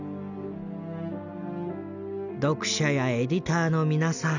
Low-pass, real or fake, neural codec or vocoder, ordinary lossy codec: 7.2 kHz; real; none; none